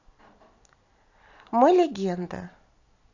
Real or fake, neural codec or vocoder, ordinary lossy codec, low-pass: real; none; AAC, 48 kbps; 7.2 kHz